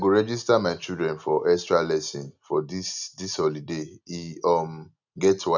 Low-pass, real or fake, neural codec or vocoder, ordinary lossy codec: 7.2 kHz; real; none; none